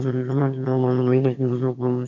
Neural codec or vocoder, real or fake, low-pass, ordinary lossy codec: autoencoder, 22.05 kHz, a latent of 192 numbers a frame, VITS, trained on one speaker; fake; 7.2 kHz; none